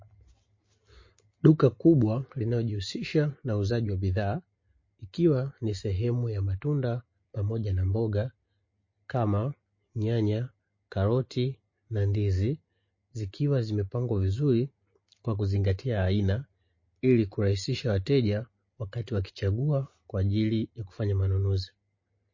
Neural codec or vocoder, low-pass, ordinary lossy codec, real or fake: none; 7.2 kHz; MP3, 32 kbps; real